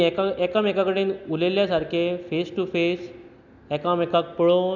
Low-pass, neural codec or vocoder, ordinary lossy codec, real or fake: 7.2 kHz; none; none; real